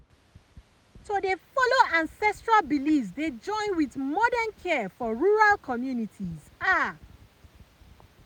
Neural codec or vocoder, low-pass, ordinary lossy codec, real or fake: none; none; none; real